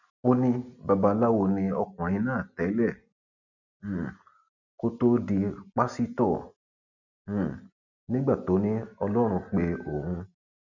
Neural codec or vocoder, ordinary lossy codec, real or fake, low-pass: none; none; real; 7.2 kHz